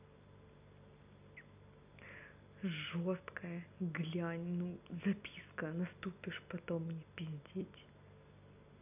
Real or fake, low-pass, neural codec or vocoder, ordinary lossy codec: real; 3.6 kHz; none; none